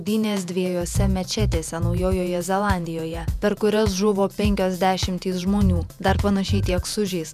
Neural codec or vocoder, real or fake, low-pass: vocoder, 48 kHz, 128 mel bands, Vocos; fake; 14.4 kHz